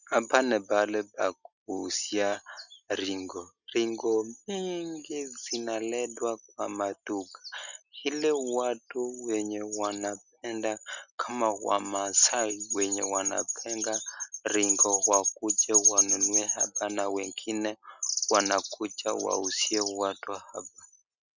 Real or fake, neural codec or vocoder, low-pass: real; none; 7.2 kHz